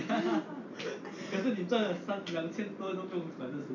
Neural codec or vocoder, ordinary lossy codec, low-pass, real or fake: none; none; 7.2 kHz; real